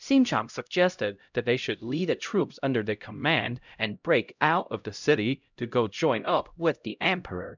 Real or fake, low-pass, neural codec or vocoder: fake; 7.2 kHz; codec, 16 kHz, 0.5 kbps, X-Codec, HuBERT features, trained on LibriSpeech